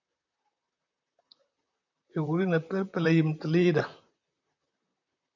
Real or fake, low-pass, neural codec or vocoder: fake; 7.2 kHz; vocoder, 44.1 kHz, 128 mel bands, Pupu-Vocoder